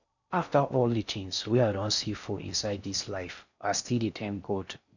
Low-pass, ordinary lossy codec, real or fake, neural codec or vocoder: 7.2 kHz; none; fake; codec, 16 kHz in and 24 kHz out, 0.6 kbps, FocalCodec, streaming, 4096 codes